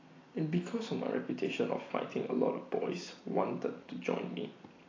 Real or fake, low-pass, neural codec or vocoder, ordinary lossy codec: real; 7.2 kHz; none; AAC, 32 kbps